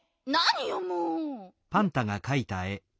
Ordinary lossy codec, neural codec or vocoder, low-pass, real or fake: none; none; none; real